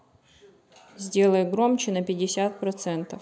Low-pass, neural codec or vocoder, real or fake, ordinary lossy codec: none; none; real; none